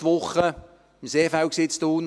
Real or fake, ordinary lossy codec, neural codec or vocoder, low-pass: real; none; none; none